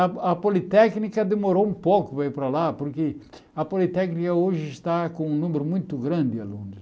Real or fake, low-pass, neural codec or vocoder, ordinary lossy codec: real; none; none; none